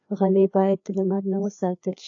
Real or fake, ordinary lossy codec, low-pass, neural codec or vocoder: fake; AAC, 48 kbps; 7.2 kHz; codec, 16 kHz, 2 kbps, FreqCodec, larger model